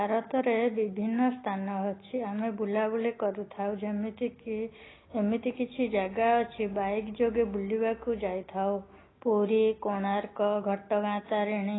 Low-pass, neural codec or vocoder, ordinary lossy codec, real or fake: 7.2 kHz; none; AAC, 16 kbps; real